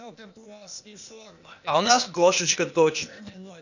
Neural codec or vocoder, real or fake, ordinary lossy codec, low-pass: codec, 16 kHz, 0.8 kbps, ZipCodec; fake; none; 7.2 kHz